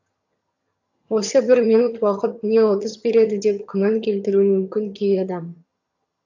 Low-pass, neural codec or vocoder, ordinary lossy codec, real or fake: 7.2 kHz; vocoder, 22.05 kHz, 80 mel bands, HiFi-GAN; AAC, 48 kbps; fake